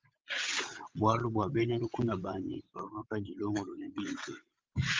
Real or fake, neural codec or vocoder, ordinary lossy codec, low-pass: fake; vocoder, 22.05 kHz, 80 mel bands, WaveNeXt; Opus, 32 kbps; 7.2 kHz